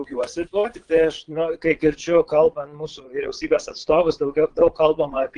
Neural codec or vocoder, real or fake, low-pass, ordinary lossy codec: vocoder, 22.05 kHz, 80 mel bands, Vocos; fake; 9.9 kHz; Opus, 24 kbps